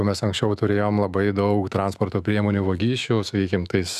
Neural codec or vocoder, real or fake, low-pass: autoencoder, 48 kHz, 128 numbers a frame, DAC-VAE, trained on Japanese speech; fake; 14.4 kHz